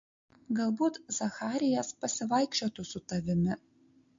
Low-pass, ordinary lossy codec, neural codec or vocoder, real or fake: 7.2 kHz; MP3, 48 kbps; none; real